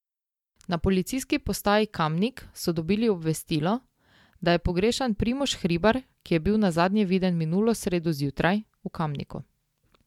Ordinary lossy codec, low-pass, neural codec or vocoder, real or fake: MP3, 96 kbps; 19.8 kHz; none; real